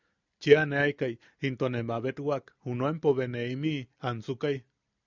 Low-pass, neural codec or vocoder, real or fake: 7.2 kHz; none; real